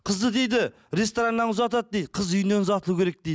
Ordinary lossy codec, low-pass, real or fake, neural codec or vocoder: none; none; real; none